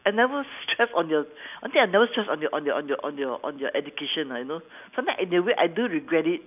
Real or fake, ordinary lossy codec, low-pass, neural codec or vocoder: real; none; 3.6 kHz; none